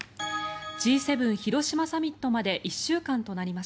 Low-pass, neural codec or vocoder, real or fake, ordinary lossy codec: none; none; real; none